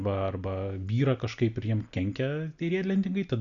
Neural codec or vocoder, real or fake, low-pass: none; real; 7.2 kHz